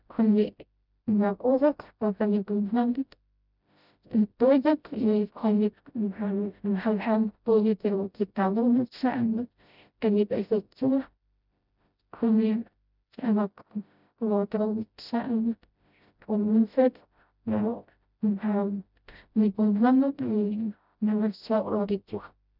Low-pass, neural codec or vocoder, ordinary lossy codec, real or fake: 5.4 kHz; codec, 16 kHz, 0.5 kbps, FreqCodec, smaller model; none; fake